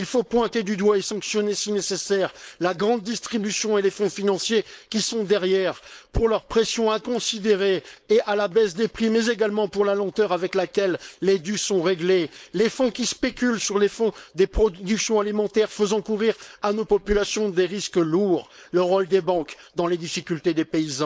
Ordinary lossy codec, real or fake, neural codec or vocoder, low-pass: none; fake; codec, 16 kHz, 4.8 kbps, FACodec; none